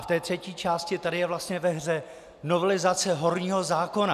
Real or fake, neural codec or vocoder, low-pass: real; none; 14.4 kHz